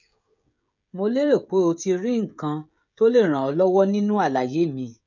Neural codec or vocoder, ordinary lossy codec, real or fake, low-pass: codec, 16 kHz, 16 kbps, FreqCodec, smaller model; none; fake; 7.2 kHz